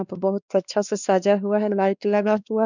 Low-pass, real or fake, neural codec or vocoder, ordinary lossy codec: 7.2 kHz; fake; codec, 16 kHz, 2 kbps, X-Codec, WavLM features, trained on Multilingual LibriSpeech; none